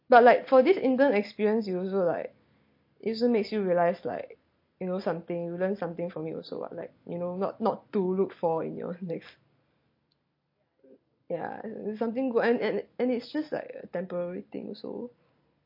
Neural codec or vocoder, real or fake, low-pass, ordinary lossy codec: none; real; 5.4 kHz; MP3, 32 kbps